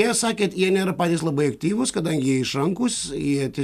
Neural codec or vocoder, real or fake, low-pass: none; real; 14.4 kHz